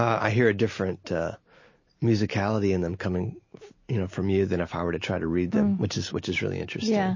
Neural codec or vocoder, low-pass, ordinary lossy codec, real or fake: none; 7.2 kHz; MP3, 32 kbps; real